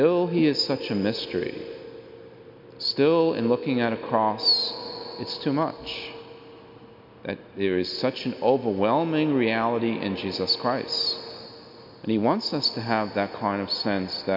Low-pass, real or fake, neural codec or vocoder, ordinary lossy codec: 5.4 kHz; real; none; MP3, 48 kbps